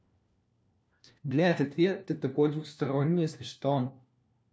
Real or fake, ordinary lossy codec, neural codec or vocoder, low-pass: fake; none; codec, 16 kHz, 1 kbps, FunCodec, trained on LibriTTS, 50 frames a second; none